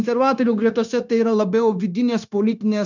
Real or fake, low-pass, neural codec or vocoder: fake; 7.2 kHz; codec, 16 kHz, 0.9 kbps, LongCat-Audio-Codec